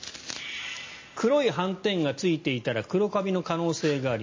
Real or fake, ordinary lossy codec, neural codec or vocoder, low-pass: real; MP3, 32 kbps; none; 7.2 kHz